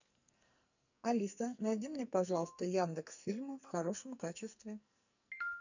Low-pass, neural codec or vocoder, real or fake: 7.2 kHz; codec, 44.1 kHz, 2.6 kbps, SNAC; fake